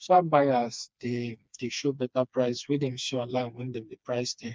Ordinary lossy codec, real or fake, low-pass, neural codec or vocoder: none; fake; none; codec, 16 kHz, 2 kbps, FreqCodec, smaller model